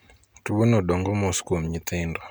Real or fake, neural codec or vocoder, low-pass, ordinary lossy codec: real; none; none; none